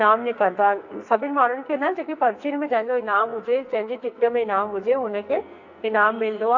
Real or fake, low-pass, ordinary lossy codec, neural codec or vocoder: fake; 7.2 kHz; AAC, 48 kbps; codec, 44.1 kHz, 2.6 kbps, SNAC